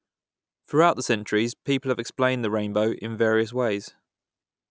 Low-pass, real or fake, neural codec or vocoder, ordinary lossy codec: none; real; none; none